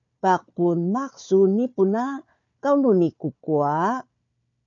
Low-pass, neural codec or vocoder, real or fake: 7.2 kHz; codec, 16 kHz, 4 kbps, FunCodec, trained on Chinese and English, 50 frames a second; fake